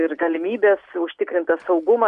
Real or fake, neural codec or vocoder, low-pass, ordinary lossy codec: real; none; 10.8 kHz; AAC, 96 kbps